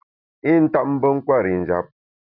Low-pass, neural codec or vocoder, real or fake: 5.4 kHz; none; real